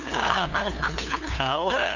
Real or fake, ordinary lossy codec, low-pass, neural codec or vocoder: fake; none; 7.2 kHz; codec, 16 kHz, 2 kbps, FunCodec, trained on LibriTTS, 25 frames a second